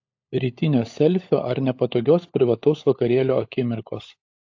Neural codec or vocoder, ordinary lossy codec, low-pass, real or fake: codec, 16 kHz, 16 kbps, FunCodec, trained on LibriTTS, 50 frames a second; AAC, 48 kbps; 7.2 kHz; fake